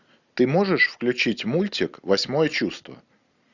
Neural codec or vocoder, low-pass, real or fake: none; 7.2 kHz; real